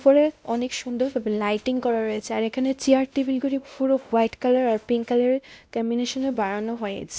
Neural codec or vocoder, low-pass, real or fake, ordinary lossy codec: codec, 16 kHz, 1 kbps, X-Codec, WavLM features, trained on Multilingual LibriSpeech; none; fake; none